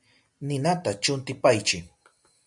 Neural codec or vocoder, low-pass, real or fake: none; 10.8 kHz; real